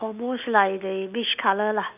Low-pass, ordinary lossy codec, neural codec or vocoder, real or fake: 3.6 kHz; none; none; real